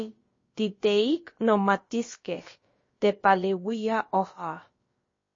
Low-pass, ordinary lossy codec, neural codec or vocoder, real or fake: 7.2 kHz; MP3, 32 kbps; codec, 16 kHz, about 1 kbps, DyCAST, with the encoder's durations; fake